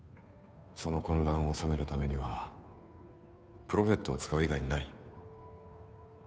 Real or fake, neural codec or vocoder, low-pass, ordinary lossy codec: fake; codec, 16 kHz, 2 kbps, FunCodec, trained on Chinese and English, 25 frames a second; none; none